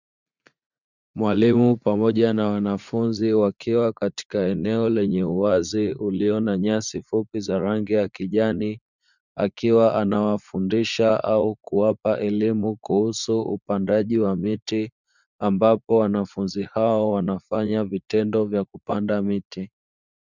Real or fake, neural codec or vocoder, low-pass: fake; vocoder, 44.1 kHz, 80 mel bands, Vocos; 7.2 kHz